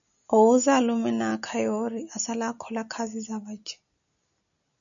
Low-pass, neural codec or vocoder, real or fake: 7.2 kHz; none; real